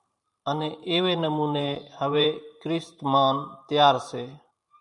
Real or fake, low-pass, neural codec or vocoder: fake; 10.8 kHz; vocoder, 44.1 kHz, 128 mel bands every 512 samples, BigVGAN v2